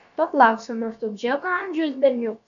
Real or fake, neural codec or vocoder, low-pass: fake; codec, 16 kHz, about 1 kbps, DyCAST, with the encoder's durations; 7.2 kHz